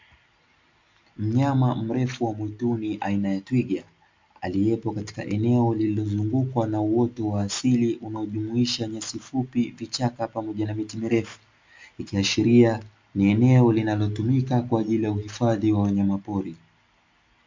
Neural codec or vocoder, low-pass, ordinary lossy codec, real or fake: none; 7.2 kHz; MP3, 64 kbps; real